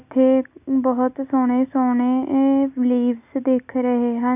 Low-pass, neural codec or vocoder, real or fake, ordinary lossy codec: 3.6 kHz; none; real; none